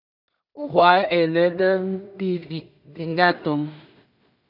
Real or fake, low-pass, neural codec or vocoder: fake; 5.4 kHz; codec, 16 kHz in and 24 kHz out, 0.4 kbps, LongCat-Audio-Codec, two codebook decoder